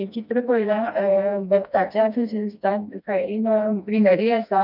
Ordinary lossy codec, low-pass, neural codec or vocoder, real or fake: none; 5.4 kHz; codec, 16 kHz, 1 kbps, FreqCodec, smaller model; fake